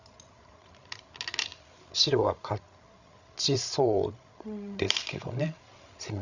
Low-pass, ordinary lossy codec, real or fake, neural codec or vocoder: 7.2 kHz; none; fake; codec, 16 kHz, 16 kbps, FreqCodec, larger model